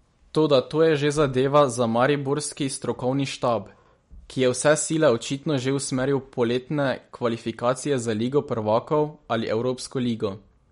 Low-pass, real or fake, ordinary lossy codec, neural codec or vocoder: 10.8 kHz; real; MP3, 48 kbps; none